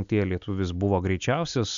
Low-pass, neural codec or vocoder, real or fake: 7.2 kHz; none; real